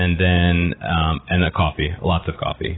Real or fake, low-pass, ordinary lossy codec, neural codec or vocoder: real; 7.2 kHz; AAC, 16 kbps; none